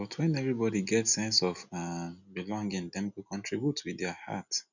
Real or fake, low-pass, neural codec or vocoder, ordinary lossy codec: real; 7.2 kHz; none; none